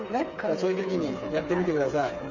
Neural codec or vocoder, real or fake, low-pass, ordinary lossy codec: codec, 16 kHz, 8 kbps, FreqCodec, smaller model; fake; 7.2 kHz; none